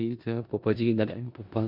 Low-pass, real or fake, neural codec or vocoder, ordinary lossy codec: 5.4 kHz; fake; codec, 16 kHz in and 24 kHz out, 0.4 kbps, LongCat-Audio-Codec, four codebook decoder; MP3, 48 kbps